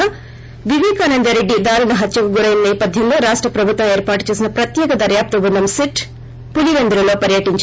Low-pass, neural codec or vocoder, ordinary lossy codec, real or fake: none; none; none; real